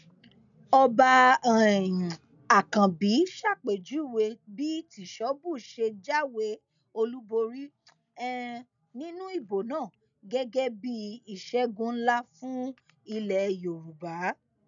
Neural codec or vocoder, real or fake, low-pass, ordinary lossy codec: none; real; 7.2 kHz; none